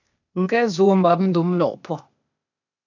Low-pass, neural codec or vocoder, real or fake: 7.2 kHz; codec, 16 kHz, 0.8 kbps, ZipCodec; fake